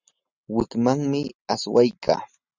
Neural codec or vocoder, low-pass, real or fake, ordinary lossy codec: none; 7.2 kHz; real; Opus, 64 kbps